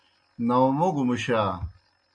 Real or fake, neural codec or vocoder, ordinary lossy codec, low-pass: real; none; AAC, 64 kbps; 9.9 kHz